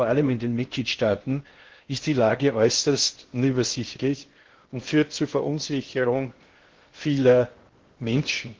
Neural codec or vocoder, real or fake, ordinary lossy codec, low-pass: codec, 16 kHz in and 24 kHz out, 0.6 kbps, FocalCodec, streaming, 4096 codes; fake; Opus, 16 kbps; 7.2 kHz